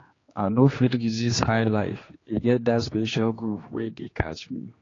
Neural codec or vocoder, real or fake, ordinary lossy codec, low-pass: codec, 16 kHz, 2 kbps, X-Codec, HuBERT features, trained on general audio; fake; AAC, 32 kbps; 7.2 kHz